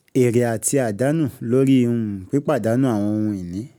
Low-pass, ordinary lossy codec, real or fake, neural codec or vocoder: none; none; real; none